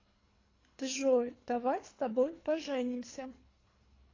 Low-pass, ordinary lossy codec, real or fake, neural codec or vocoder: 7.2 kHz; AAC, 32 kbps; fake; codec, 24 kHz, 3 kbps, HILCodec